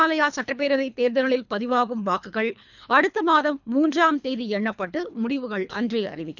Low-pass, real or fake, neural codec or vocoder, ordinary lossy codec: 7.2 kHz; fake; codec, 24 kHz, 3 kbps, HILCodec; none